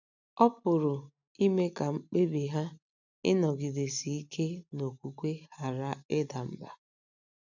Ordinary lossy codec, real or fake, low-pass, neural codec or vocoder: none; real; 7.2 kHz; none